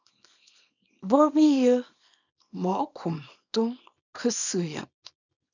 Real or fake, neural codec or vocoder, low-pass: fake; codec, 24 kHz, 0.9 kbps, WavTokenizer, small release; 7.2 kHz